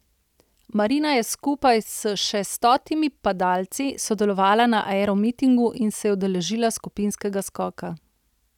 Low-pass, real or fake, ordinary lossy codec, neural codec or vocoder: 19.8 kHz; real; none; none